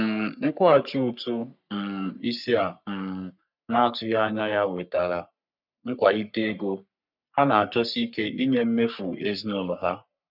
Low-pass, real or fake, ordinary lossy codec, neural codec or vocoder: 5.4 kHz; fake; none; codec, 44.1 kHz, 3.4 kbps, Pupu-Codec